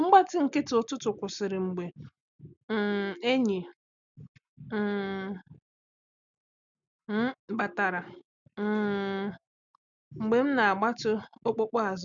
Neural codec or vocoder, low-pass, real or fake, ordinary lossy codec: none; 7.2 kHz; real; none